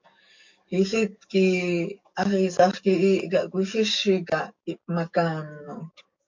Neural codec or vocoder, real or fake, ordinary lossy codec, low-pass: codec, 44.1 kHz, 7.8 kbps, DAC; fake; MP3, 48 kbps; 7.2 kHz